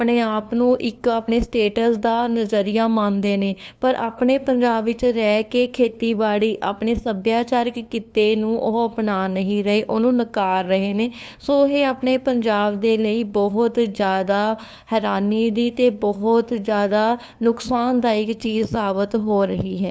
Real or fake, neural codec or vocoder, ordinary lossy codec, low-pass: fake; codec, 16 kHz, 2 kbps, FunCodec, trained on LibriTTS, 25 frames a second; none; none